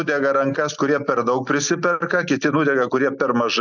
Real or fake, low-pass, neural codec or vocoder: real; 7.2 kHz; none